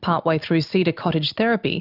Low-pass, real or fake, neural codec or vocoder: 5.4 kHz; real; none